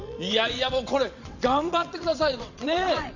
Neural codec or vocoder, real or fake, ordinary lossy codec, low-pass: vocoder, 22.05 kHz, 80 mel bands, WaveNeXt; fake; none; 7.2 kHz